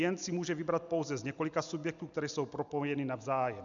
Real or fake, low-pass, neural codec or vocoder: real; 7.2 kHz; none